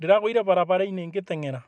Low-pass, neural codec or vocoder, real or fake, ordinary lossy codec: none; none; real; none